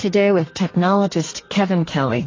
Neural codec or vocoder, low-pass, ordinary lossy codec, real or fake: codec, 32 kHz, 1.9 kbps, SNAC; 7.2 kHz; AAC, 32 kbps; fake